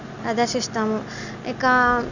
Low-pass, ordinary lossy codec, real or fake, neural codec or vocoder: 7.2 kHz; none; real; none